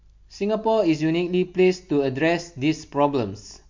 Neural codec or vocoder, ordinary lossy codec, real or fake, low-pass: none; MP3, 48 kbps; real; 7.2 kHz